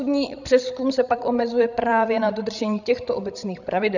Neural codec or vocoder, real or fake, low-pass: codec, 16 kHz, 16 kbps, FreqCodec, larger model; fake; 7.2 kHz